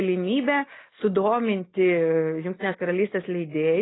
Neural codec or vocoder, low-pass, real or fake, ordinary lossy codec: none; 7.2 kHz; real; AAC, 16 kbps